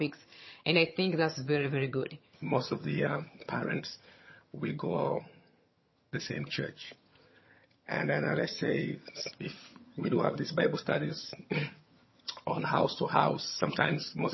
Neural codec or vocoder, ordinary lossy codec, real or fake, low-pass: vocoder, 22.05 kHz, 80 mel bands, HiFi-GAN; MP3, 24 kbps; fake; 7.2 kHz